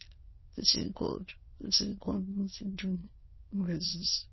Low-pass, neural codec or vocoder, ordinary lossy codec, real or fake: 7.2 kHz; autoencoder, 22.05 kHz, a latent of 192 numbers a frame, VITS, trained on many speakers; MP3, 24 kbps; fake